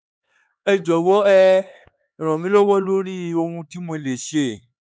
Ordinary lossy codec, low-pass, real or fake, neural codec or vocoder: none; none; fake; codec, 16 kHz, 4 kbps, X-Codec, HuBERT features, trained on LibriSpeech